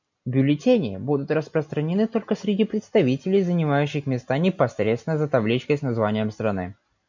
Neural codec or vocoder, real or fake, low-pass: none; real; 7.2 kHz